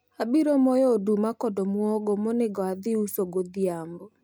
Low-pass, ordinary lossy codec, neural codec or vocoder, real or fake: none; none; none; real